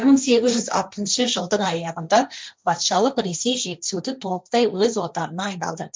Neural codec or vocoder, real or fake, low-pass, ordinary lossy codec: codec, 16 kHz, 1.1 kbps, Voila-Tokenizer; fake; none; none